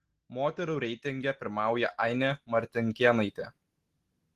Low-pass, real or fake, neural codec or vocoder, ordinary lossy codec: 14.4 kHz; real; none; Opus, 16 kbps